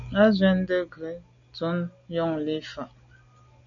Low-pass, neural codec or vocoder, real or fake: 7.2 kHz; none; real